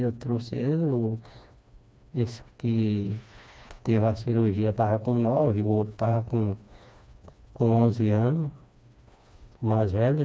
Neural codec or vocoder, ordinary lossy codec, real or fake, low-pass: codec, 16 kHz, 2 kbps, FreqCodec, smaller model; none; fake; none